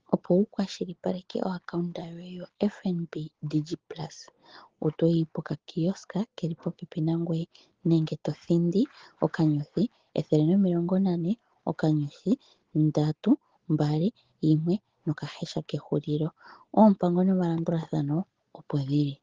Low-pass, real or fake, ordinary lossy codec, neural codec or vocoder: 7.2 kHz; real; Opus, 16 kbps; none